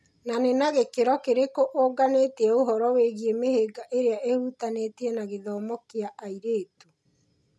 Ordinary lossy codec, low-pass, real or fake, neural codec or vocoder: none; none; real; none